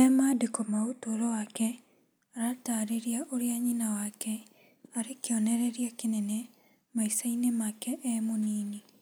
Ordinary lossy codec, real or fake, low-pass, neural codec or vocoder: none; real; none; none